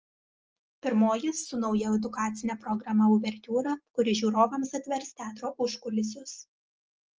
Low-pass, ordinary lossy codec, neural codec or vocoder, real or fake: 7.2 kHz; Opus, 24 kbps; none; real